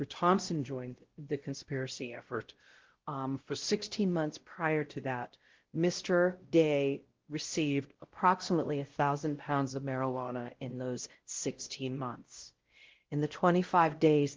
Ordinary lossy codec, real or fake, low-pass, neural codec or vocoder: Opus, 16 kbps; fake; 7.2 kHz; codec, 16 kHz, 0.5 kbps, X-Codec, WavLM features, trained on Multilingual LibriSpeech